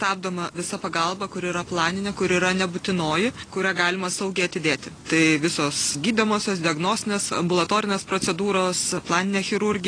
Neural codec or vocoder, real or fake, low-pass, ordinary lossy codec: none; real; 9.9 kHz; AAC, 32 kbps